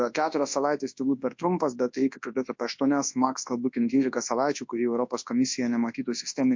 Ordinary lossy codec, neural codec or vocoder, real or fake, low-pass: MP3, 48 kbps; codec, 24 kHz, 0.9 kbps, WavTokenizer, large speech release; fake; 7.2 kHz